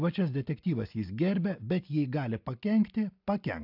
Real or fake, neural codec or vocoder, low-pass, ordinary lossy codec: real; none; 5.4 kHz; AAC, 48 kbps